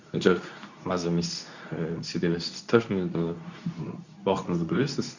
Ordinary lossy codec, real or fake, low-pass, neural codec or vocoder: none; fake; 7.2 kHz; codec, 24 kHz, 0.9 kbps, WavTokenizer, medium speech release version 2